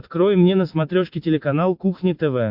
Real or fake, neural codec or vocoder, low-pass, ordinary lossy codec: real; none; 5.4 kHz; MP3, 48 kbps